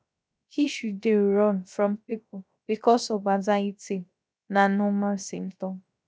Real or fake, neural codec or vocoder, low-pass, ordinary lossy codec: fake; codec, 16 kHz, 0.3 kbps, FocalCodec; none; none